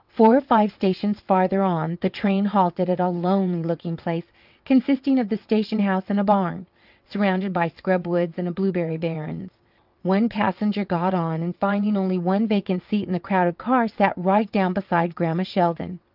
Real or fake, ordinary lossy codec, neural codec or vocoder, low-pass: fake; Opus, 24 kbps; vocoder, 22.05 kHz, 80 mel bands, WaveNeXt; 5.4 kHz